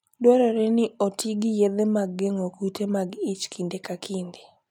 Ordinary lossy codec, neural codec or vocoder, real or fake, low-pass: none; none; real; 19.8 kHz